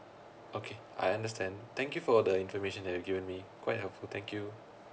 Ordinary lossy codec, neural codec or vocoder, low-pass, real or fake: none; none; none; real